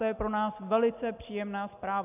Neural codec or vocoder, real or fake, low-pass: none; real; 3.6 kHz